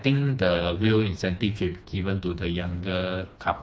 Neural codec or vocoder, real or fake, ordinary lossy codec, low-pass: codec, 16 kHz, 2 kbps, FreqCodec, smaller model; fake; none; none